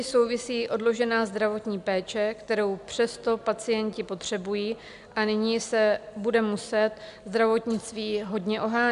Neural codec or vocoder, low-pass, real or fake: none; 10.8 kHz; real